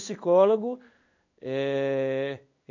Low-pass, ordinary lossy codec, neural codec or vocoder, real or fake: 7.2 kHz; none; codec, 16 kHz in and 24 kHz out, 1 kbps, XY-Tokenizer; fake